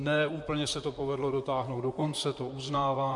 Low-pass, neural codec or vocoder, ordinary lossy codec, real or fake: 10.8 kHz; vocoder, 44.1 kHz, 128 mel bands, Pupu-Vocoder; MP3, 64 kbps; fake